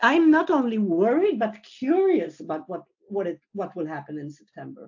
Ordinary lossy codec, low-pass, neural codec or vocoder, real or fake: AAC, 48 kbps; 7.2 kHz; none; real